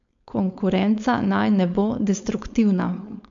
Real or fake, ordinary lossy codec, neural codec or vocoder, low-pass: fake; MP3, 64 kbps; codec, 16 kHz, 4.8 kbps, FACodec; 7.2 kHz